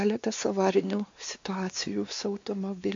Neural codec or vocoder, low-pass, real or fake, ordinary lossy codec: codec, 16 kHz, 2 kbps, X-Codec, WavLM features, trained on Multilingual LibriSpeech; 7.2 kHz; fake; MP3, 48 kbps